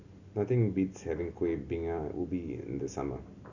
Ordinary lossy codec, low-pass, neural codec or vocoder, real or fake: Opus, 64 kbps; 7.2 kHz; none; real